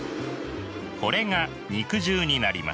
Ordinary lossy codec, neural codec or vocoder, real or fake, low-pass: none; none; real; none